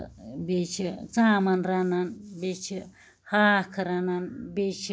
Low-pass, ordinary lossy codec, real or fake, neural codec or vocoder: none; none; real; none